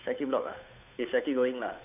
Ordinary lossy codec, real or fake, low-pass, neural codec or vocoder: none; fake; 3.6 kHz; codec, 16 kHz, 8 kbps, FunCodec, trained on Chinese and English, 25 frames a second